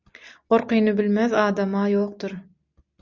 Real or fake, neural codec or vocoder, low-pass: real; none; 7.2 kHz